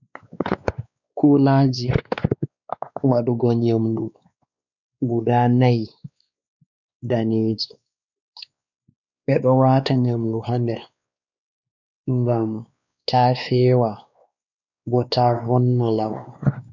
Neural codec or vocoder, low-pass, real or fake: codec, 16 kHz, 2 kbps, X-Codec, WavLM features, trained on Multilingual LibriSpeech; 7.2 kHz; fake